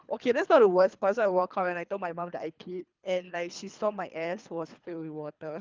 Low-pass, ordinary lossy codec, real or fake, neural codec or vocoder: 7.2 kHz; Opus, 24 kbps; fake; codec, 24 kHz, 3 kbps, HILCodec